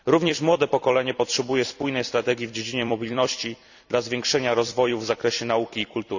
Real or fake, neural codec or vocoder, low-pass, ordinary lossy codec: fake; vocoder, 44.1 kHz, 128 mel bands every 256 samples, BigVGAN v2; 7.2 kHz; none